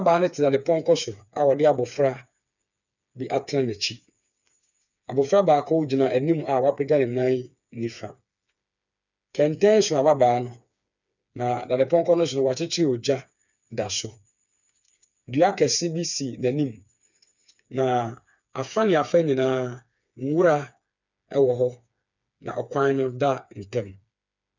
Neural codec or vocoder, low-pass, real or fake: codec, 16 kHz, 4 kbps, FreqCodec, smaller model; 7.2 kHz; fake